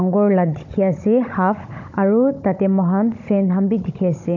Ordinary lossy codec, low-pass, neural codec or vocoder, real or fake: none; 7.2 kHz; codec, 16 kHz, 16 kbps, FunCodec, trained on Chinese and English, 50 frames a second; fake